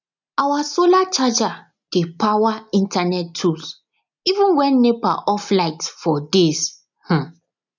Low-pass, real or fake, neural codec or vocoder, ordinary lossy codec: 7.2 kHz; real; none; none